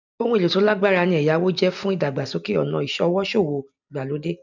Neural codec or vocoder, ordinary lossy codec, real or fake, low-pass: none; none; real; 7.2 kHz